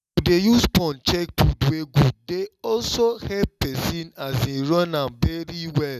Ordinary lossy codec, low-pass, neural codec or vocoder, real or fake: none; 14.4 kHz; none; real